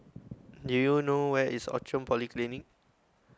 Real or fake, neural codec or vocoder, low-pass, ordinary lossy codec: real; none; none; none